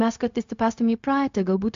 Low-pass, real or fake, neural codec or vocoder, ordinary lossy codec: 7.2 kHz; fake; codec, 16 kHz, 0.4 kbps, LongCat-Audio-Codec; MP3, 64 kbps